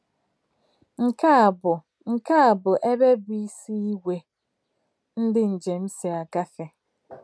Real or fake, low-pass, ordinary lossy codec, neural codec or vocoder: real; none; none; none